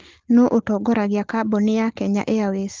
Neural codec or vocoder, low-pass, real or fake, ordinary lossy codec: none; 7.2 kHz; real; Opus, 16 kbps